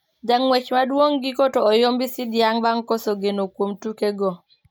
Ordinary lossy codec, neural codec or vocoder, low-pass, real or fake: none; none; none; real